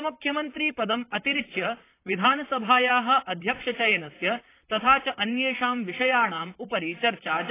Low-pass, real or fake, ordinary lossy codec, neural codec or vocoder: 3.6 kHz; fake; AAC, 24 kbps; vocoder, 44.1 kHz, 128 mel bands, Pupu-Vocoder